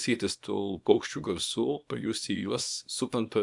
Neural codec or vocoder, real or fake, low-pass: codec, 24 kHz, 0.9 kbps, WavTokenizer, small release; fake; 10.8 kHz